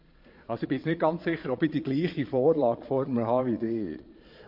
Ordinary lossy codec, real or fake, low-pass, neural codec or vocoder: MP3, 32 kbps; fake; 5.4 kHz; vocoder, 22.05 kHz, 80 mel bands, Vocos